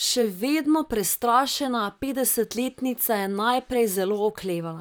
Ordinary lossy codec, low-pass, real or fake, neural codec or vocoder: none; none; fake; vocoder, 44.1 kHz, 128 mel bands, Pupu-Vocoder